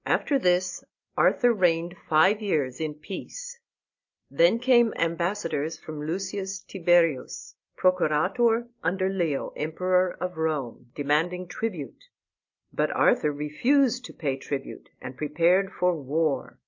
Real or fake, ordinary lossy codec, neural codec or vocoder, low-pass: real; AAC, 48 kbps; none; 7.2 kHz